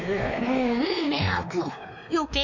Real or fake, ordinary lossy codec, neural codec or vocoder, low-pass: fake; none; codec, 16 kHz, 2 kbps, X-Codec, WavLM features, trained on Multilingual LibriSpeech; 7.2 kHz